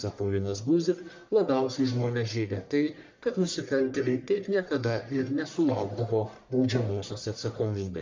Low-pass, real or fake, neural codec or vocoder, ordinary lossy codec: 7.2 kHz; fake; codec, 44.1 kHz, 1.7 kbps, Pupu-Codec; MP3, 64 kbps